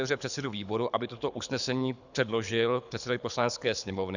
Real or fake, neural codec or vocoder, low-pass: fake; codec, 24 kHz, 6 kbps, HILCodec; 7.2 kHz